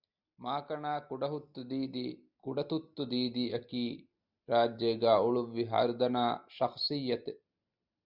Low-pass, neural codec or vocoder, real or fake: 5.4 kHz; none; real